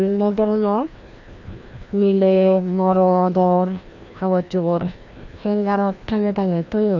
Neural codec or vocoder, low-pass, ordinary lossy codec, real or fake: codec, 16 kHz, 1 kbps, FreqCodec, larger model; 7.2 kHz; AAC, 48 kbps; fake